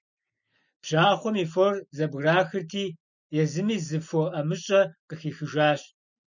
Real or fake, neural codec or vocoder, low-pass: real; none; 7.2 kHz